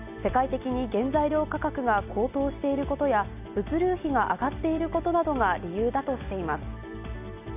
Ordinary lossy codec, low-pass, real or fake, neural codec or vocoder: none; 3.6 kHz; real; none